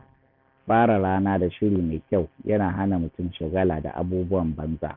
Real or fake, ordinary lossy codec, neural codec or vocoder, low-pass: real; none; none; 5.4 kHz